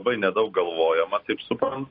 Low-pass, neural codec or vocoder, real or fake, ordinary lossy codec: 5.4 kHz; none; real; AAC, 32 kbps